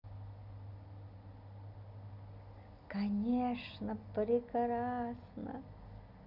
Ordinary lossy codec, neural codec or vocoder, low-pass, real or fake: Opus, 64 kbps; none; 5.4 kHz; real